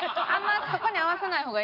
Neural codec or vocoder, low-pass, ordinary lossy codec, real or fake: none; 5.4 kHz; none; real